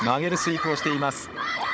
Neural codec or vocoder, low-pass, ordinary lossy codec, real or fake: codec, 16 kHz, 16 kbps, FunCodec, trained on Chinese and English, 50 frames a second; none; none; fake